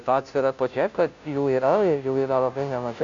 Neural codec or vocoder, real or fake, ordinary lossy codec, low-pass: codec, 16 kHz, 0.5 kbps, FunCodec, trained on Chinese and English, 25 frames a second; fake; AAC, 64 kbps; 7.2 kHz